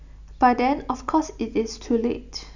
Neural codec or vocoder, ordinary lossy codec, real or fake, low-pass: none; none; real; 7.2 kHz